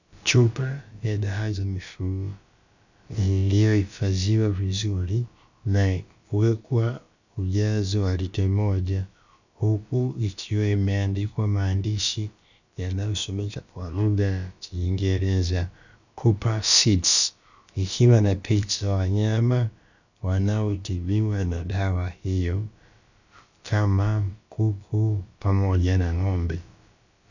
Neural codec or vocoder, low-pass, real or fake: codec, 16 kHz, about 1 kbps, DyCAST, with the encoder's durations; 7.2 kHz; fake